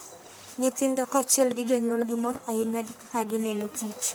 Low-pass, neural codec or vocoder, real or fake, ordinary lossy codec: none; codec, 44.1 kHz, 1.7 kbps, Pupu-Codec; fake; none